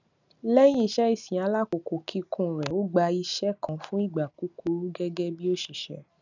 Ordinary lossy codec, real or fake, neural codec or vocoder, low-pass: none; real; none; 7.2 kHz